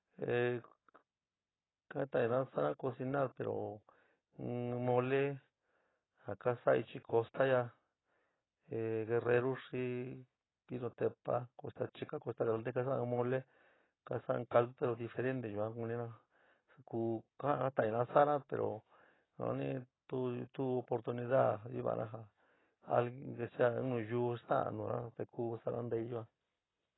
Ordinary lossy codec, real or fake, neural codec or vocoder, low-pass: AAC, 16 kbps; real; none; 7.2 kHz